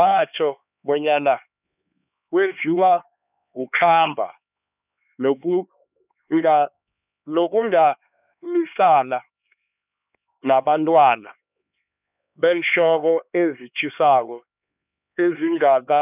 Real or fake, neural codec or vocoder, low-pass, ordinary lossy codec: fake; codec, 16 kHz, 2 kbps, X-Codec, HuBERT features, trained on LibriSpeech; 3.6 kHz; none